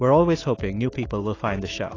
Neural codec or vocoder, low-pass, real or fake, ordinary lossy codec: autoencoder, 48 kHz, 128 numbers a frame, DAC-VAE, trained on Japanese speech; 7.2 kHz; fake; AAC, 32 kbps